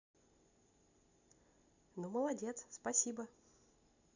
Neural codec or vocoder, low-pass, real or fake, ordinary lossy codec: none; 7.2 kHz; real; none